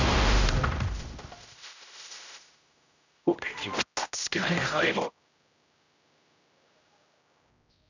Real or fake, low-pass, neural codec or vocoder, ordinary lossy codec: fake; 7.2 kHz; codec, 16 kHz, 0.5 kbps, X-Codec, HuBERT features, trained on general audio; none